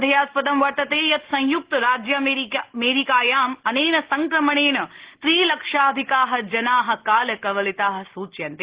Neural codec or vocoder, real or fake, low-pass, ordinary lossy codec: none; real; 3.6 kHz; Opus, 16 kbps